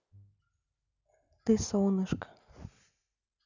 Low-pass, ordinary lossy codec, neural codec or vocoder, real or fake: 7.2 kHz; none; none; real